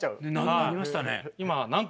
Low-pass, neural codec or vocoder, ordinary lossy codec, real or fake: none; none; none; real